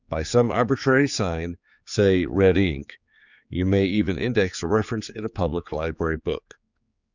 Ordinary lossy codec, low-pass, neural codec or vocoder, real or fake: Opus, 64 kbps; 7.2 kHz; codec, 16 kHz, 4 kbps, X-Codec, HuBERT features, trained on general audio; fake